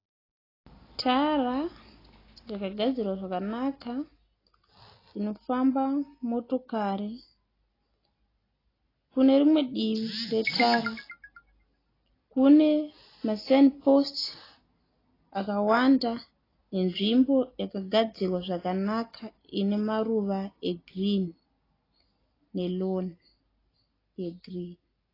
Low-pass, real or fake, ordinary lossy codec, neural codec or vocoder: 5.4 kHz; real; AAC, 24 kbps; none